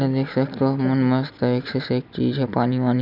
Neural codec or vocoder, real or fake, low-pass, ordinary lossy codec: none; real; 5.4 kHz; none